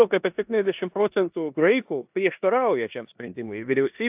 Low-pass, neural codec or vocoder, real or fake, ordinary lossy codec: 3.6 kHz; codec, 16 kHz in and 24 kHz out, 0.9 kbps, LongCat-Audio-Codec, four codebook decoder; fake; AAC, 32 kbps